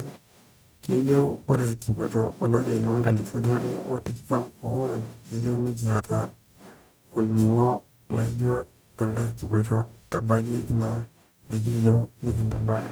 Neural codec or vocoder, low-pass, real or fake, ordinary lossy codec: codec, 44.1 kHz, 0.9 kbps, DAC; none; fake; none